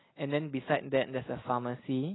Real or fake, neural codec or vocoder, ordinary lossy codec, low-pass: real; none; AAC, 16 kbps; 7.2 kHz